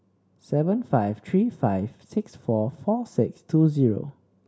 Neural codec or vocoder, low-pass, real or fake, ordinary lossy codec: none; none; real; none